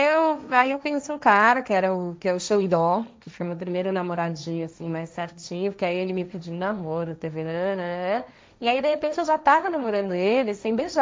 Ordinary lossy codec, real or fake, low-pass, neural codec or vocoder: none; fake; none; codec, 16 kHz, 1.1 kbps, Voila-Tokenizer